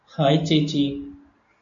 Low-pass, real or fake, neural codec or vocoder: 7.2 kHz; real; none